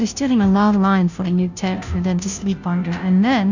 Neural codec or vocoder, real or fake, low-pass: codec, 16 kHz, 0.5 kbps, FunCodec, trained on Chinese and English, 25 frames a second; fake; 7.2 kHz